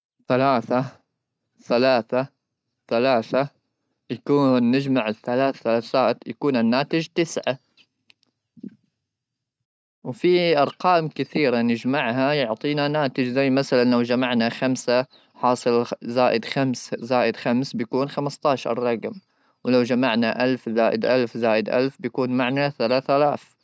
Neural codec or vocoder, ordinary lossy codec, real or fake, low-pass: none; none; real; none